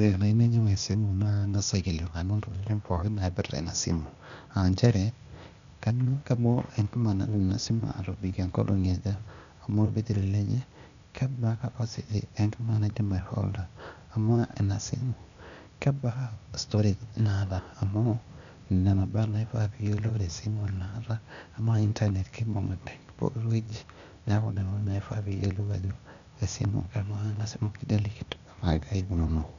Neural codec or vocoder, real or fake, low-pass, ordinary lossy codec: codec, 16 kHz, 0.8 kbps, ZipCodec; fake; 7.2 kHz; none